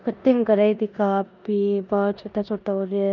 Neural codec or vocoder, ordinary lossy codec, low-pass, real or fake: codec, 16 kHz in and 24 kHz out, 0.9 kbps, LongCat-Audio-Codec, four codebook decoder; none; 7.2 kHz; fake